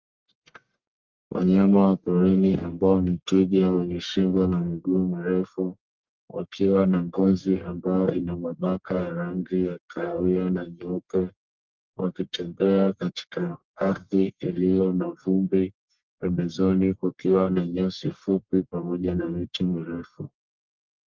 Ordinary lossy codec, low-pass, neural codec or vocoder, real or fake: Opus, 32 kbps; 7.2 kHz; codec, 44.1 kHz, 1.7 kbps, Pupu-Codec; fake